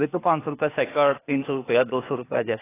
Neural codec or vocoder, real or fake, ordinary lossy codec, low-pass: codec, 16 kHz, 0.8 kbps, ZipCodec; fake; AAC, 16 kbps; 3.6 kHz